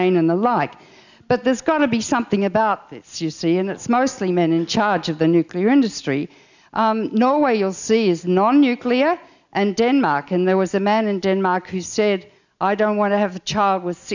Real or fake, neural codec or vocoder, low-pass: real; none; 7.2 kHz